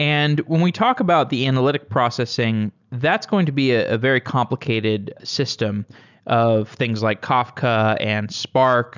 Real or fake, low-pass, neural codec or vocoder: real; 7.2 kHz; none